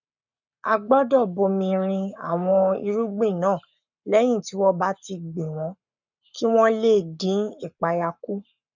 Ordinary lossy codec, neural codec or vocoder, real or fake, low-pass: none; codec, 44.1 kHz, 7.8 kbps, Pupu-Codec; fake; 7.2 kHz